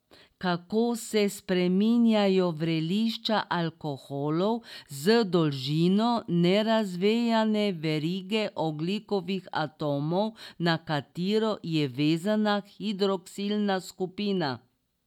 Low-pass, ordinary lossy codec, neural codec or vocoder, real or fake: 19.8 kHz; none; none; real